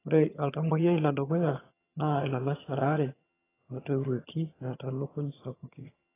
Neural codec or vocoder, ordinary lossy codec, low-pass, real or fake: vocoder, 22.05 kHz, 80 mel bands, HiFi-GAN; AAC, 16 kbps; 3.6 kHz; fake